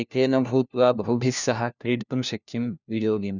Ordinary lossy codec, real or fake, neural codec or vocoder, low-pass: none; fake; codec, 16 kHz, 1 kbps, FunCodec, trained on LibriTTS, 50 frames a second; 7.2 kHz